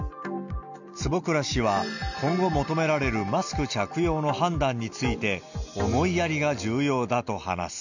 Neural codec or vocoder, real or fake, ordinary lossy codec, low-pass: none; real; none; 7.2 kHz